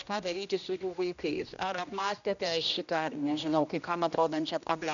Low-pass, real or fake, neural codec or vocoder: 7.2 kHz; fake; codec, 16 kHz, 1 kbps, X-Codec, HuBERT features, trained on general audio